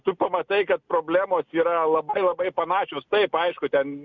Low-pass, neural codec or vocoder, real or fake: 7.2 kHz; none; real